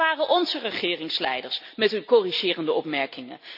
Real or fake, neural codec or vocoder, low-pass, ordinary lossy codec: real; none; 5.4 kHz; none